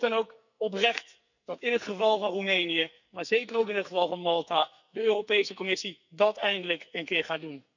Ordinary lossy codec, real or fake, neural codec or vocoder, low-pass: none; fake; codec, 44.1 kHz, 2.6 kbps, SNAC; 7.2 kHz